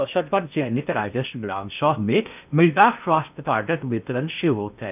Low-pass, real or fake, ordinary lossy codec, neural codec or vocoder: 3.6 kHz; fake; none; codec, 16 kHz in and 24 kHz out, 0.6 kbps, FocalCodec, streaming, 2048 codes